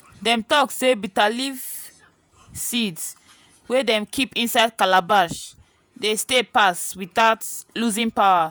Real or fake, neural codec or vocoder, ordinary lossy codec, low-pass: fake; vocoder, 48 kHz, 128 mel bands, Vocos; none; none